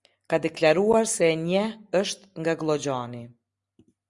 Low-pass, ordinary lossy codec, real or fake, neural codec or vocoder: 10.8 kHz; Opus, 64 kbps; real; none